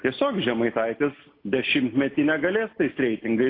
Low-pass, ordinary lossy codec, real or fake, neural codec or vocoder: 5.4 kHz; AAC, 32 kbps; real; none